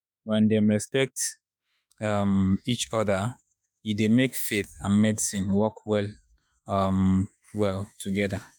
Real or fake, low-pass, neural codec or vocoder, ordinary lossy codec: fake; none; autoencoder, 48 kHz, 32 numbers a frame, DAC-VAE, trained on Japanese speech; none